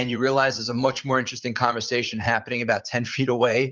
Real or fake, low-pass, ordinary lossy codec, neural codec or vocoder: fake; 7.2 kHz; Opus, 24 kbps; vocoder, 44.1 kHz, 80 mel bands, Vocos